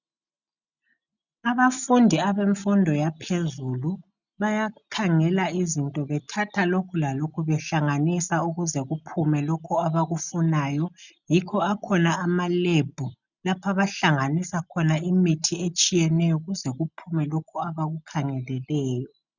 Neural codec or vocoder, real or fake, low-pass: none; real; 7.2 kHz